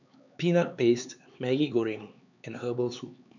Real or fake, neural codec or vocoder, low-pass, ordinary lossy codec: fake; codec, 16 kHz, 4 kbps, X-Codec, HuBERT features, trained on LibriSpeech; 7.2 kHz; none